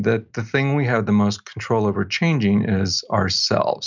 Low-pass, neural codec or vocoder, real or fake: 7.2 kHz; none; real